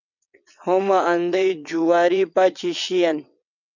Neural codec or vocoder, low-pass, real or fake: vocoder, 22.05 kHz, 80 mel bands, WaveNeXt; 7.2 kHz; fake